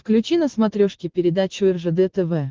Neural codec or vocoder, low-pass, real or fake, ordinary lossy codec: none; 7.2 kHz; real; Opus, 16 kbps